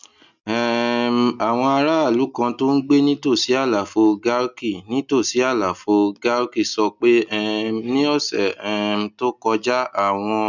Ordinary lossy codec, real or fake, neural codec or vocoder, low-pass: none; real; none; 7.2 kHz